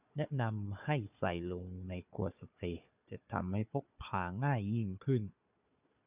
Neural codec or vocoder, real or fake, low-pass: codec, 16 kHz, 4 kbps, FunCodec, trained on Chinese and English, 50 frames a second; fake; 3.6 kHz